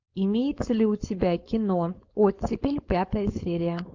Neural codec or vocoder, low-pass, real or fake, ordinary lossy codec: codec, 16 kHz, 4.8 kbps, FACodec; 7.2 kHz; fake; AAC, 48 kbps